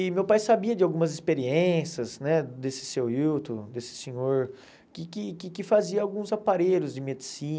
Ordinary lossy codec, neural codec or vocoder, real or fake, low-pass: none; none; real; none